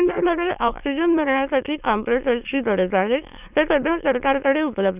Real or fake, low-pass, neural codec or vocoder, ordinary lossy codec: fake; 3.6 kHz; autoencoder, 22.05 kHz, a latent of 192 numbers a frame, VITS, trained on many speakers; none